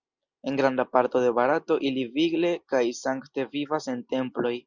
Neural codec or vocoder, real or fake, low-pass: none; real; 7.2 kHz